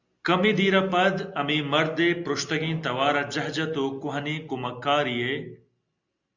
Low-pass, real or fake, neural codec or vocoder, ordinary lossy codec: 7.2 kHz; real; none; Opus, 64 kbps